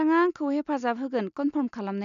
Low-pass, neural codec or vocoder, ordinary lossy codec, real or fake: 7.2 kHz; none; none; real